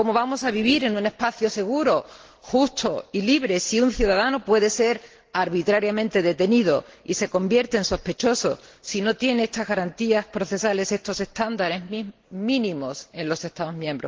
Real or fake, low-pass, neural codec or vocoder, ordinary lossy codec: real; 7.2 kHz; none; Opus, 16 kbps